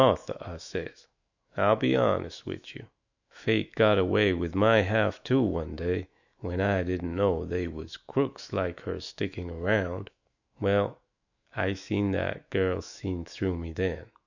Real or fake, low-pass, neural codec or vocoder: fake; 7.2 kHz; autoencoder, 48 kHz, 128 numbers a frame, DAC-VAE, trained on Japanese speech